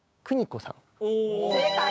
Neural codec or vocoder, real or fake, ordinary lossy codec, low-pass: codec, 16 kHz, 6 kbps, DAC; fake; none; none